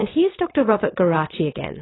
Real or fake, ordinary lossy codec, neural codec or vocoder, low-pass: fake; AAC, 16 kbps; vocoder, 22.05 kHz, 80 mel bands, WaveNeXt; 7.2 kHz